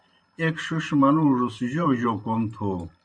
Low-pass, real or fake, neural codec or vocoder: 9.9 kHz; fake; vocoder, 44.1 kHz, 128 mel bands every 512 samples, BigVGAN v2